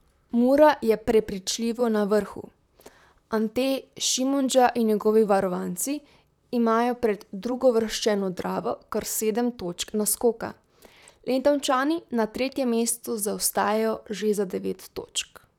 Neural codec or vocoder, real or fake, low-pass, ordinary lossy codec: vocoder, 44.1 kHz, 128 mel bands, Pupu-Vocoder; fake; 19.8 kHz; none